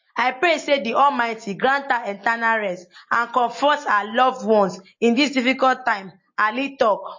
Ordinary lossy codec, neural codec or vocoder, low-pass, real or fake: MP3, 32 kbps; none; 7.2 kHz; real